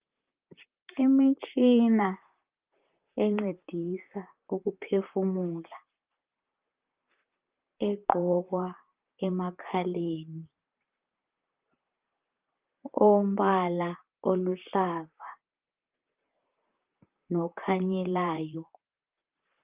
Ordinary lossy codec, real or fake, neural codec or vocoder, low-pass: Opus, 24 kbps; fake; vocoder, 44.1 kHz, 128 mel bands, Pupu-Vocoder; 3.6 kHz